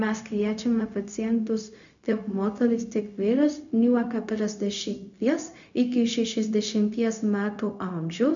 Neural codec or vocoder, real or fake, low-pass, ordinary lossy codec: codec, 16 kHz, 0.4 kbps, LongCat-Audio-Codec; fake; 7.2 kHz; MP3, 96 kbps